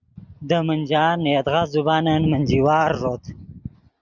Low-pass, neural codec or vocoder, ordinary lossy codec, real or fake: 7.2 kHz; none; Opus, 64 kbps; real